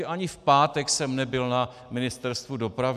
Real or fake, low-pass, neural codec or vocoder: real; 14.4 kHz; none